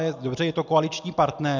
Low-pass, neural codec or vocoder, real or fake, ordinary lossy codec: 7.2 kHz; none; real; MP3, 64 kbps